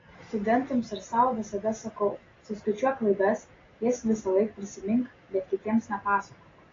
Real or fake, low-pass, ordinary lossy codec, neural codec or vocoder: real; 7.2 kHz; AAC, 32 kbps; none